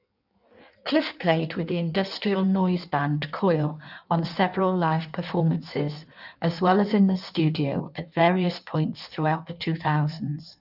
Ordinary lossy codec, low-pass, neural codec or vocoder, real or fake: none; 5.4 kHz; codec, 16 kHz in and 24 kHz out, 1.1 kbps, FireRedTTS-2 codec; fake